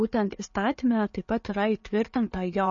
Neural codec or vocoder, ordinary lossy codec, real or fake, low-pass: none; MP3, 32 kbps; real; 7.2 kHz